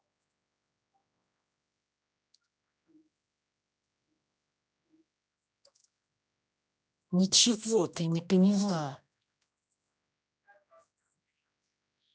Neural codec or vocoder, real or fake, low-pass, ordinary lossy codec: codec, 16 kHz, 1 kbps, X-Codec, HuBERT features, trained on general audio; fake; none; none